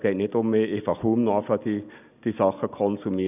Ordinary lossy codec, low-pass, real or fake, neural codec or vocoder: none; 3.6 kHz; real; none